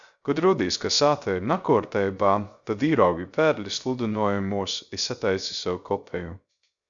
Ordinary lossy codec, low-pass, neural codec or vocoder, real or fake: Opus, 64 kbps; 7.2 kHz; codec, 16 kHz, 0.3 kbps, FocalCodec; fake